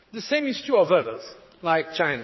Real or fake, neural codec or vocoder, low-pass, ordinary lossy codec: fake; codec, 16 kHz, 4 kbps, X-Codec, HuBERT features, trained on general audio; 7.2 kHz; MP3, 24 kbps